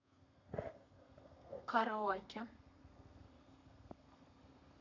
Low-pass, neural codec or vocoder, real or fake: 7.2 kHz; codec, 32 kHz, 1.9 kbps, SNAC; fake